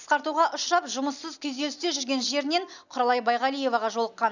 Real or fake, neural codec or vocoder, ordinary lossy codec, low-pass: real; none; none; 7.2 kHz